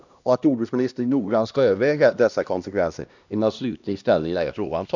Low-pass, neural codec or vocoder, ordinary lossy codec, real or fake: 7.2 kHz; codec, 16 kHz, 1 kbps, X-Codec, HuBERT features, trained on LibriSpeech; none; fake